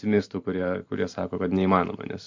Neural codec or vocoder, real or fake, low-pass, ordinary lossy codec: vocoder, 44.1 kHz, 128 mel bands every 512 samples, BigVGAN v2; fake; 7.2 kHz; MP3, 64 kbps